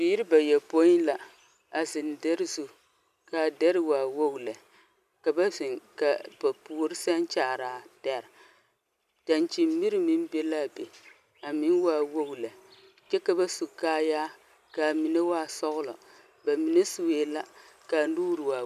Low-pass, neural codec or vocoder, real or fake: 14.4 kHz; none; real